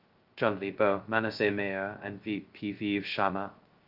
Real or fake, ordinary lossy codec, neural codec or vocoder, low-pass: fake; Opus, 32 kbps; codec, 16 kHz, 0.2 kbps, FocalCodec; 5.4 kHz